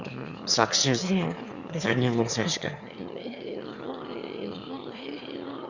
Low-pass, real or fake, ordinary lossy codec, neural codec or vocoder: 7.2 kHz; fake; Opus, 64 kbps; autoencoder, 22.05 kHz, a latent of 192 numbers a frame, VITS, trained on one speaker